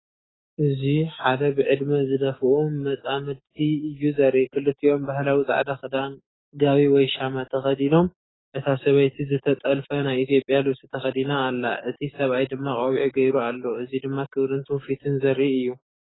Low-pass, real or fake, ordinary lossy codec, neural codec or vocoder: 7.2 kHz; fake; AAC, 16 kbps; codec, 16 kHz, 6 kbps, DAC